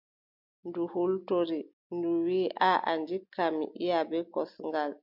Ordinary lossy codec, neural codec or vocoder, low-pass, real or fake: MP3, 48 kbps; none; 5.4 kHz; real